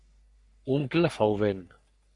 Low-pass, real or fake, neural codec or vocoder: 10.8 kHz; fake; codec, 44.1 kHz, 3.4 kbps, Pupu-Codec